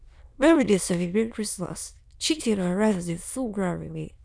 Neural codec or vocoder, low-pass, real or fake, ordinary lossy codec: autoencoder, 22.05 kHz, a latent of 192 numbers a frame, VITS, trained on many speakers; none; fake; none